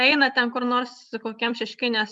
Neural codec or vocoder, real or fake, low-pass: vocoder, 44.1 kHz, 128 mel bands every 256 samples, BigVGAN v2; fake; 10.8 kHz